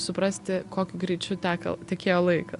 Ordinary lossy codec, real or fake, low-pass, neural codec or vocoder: Opus, 64 kbps; real; 10.8 kHz; none